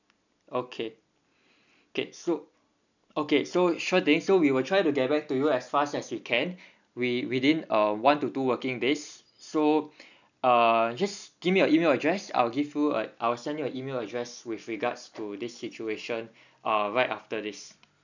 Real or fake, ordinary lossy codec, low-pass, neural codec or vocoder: real; none; 7.2 kHz; none